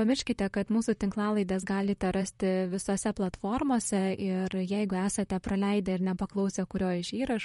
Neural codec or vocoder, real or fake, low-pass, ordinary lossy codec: vocoder, 44.1 kHz, 128 mel bands, Pupu-Vocoder; fake; 19.8 kHz; MP3, 48 kbps